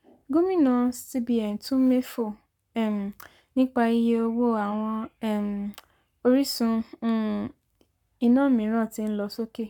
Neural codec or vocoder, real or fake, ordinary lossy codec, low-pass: codec, 44.1 kHz, 7.8 kbps, Pupu-Codec; fake; none; 19.8 kHz